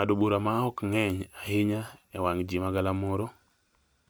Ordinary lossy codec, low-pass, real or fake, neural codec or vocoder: none; none; fake; vocoder, 44.1 kHz, 128 mel bands every 256 samples, BigVGAN v2